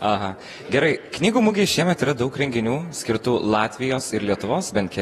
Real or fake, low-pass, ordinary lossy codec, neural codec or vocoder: fake; 19.8 kHz; AAC, 32 kbps; vocoder, 48 kHz, 128 mel bands, Vocos